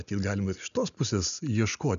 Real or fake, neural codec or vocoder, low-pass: real; none; 7.2 kHz